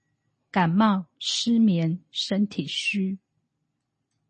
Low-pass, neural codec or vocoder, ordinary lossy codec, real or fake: 9.9 kHz; none; MP3, 32 kbps; real